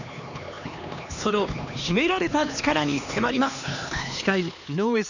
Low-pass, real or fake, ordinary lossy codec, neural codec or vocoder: 7.2 kHz; fake; none; codec, 16 kHz, 2 kbps, X-Codec, HuBERT features, trained on LibriSpeech